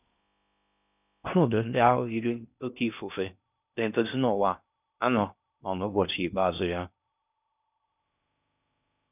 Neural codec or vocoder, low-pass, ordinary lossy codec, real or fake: codec, 16 kHz in and 24 kHz out, 0.6 kbps, FocalCodec, streaming, 4096 codes; 3.6 kHz; none; fake